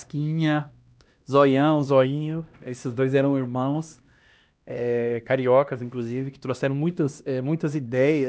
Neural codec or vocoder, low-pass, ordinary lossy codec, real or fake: codec, 16 kHz, 1 kbps, X-Codec, HuBERT features, trained on LibriSpeech; none; none; fake